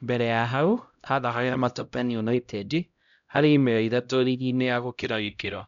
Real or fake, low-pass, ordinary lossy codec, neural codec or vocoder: fake; 7.2 kHz; none; codec, 16 kHz, 0.5 kbps, X-Codec, HuBERT features, trained on LibriSpeech